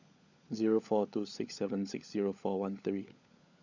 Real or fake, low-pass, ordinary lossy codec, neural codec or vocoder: fake; 7.2 kHz; none; codec, 16 kHz, 16 kbps, FunCodec, trained on LibriTTS, 50 frames a second